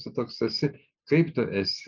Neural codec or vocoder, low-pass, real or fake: none; 7.2 kHz; real